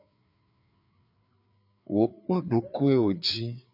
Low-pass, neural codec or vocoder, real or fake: 5.4 kHz; codec, 16 kHz, 4 kbps, FreqCodec, larger model; fake